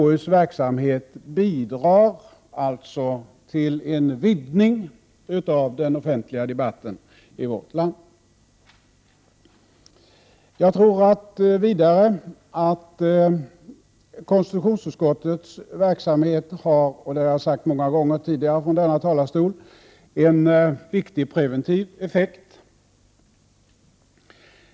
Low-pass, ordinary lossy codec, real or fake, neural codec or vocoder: none; none; real; none